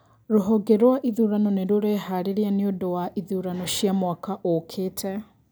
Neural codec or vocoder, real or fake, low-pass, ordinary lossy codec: none; real; none; none